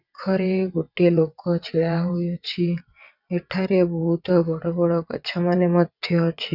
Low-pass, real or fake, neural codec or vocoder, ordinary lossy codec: 5.4 kHz; fake; vocoder, 22.05 kHz, 80 mel bands, WaveNeXt; none